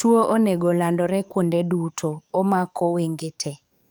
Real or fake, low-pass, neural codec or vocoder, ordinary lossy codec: fake; none; codec, 44.1 kHz, 7.8 kbps, DAC; none